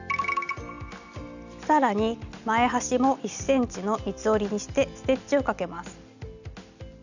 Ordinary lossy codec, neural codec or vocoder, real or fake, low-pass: none; none; real; 7.2 kHz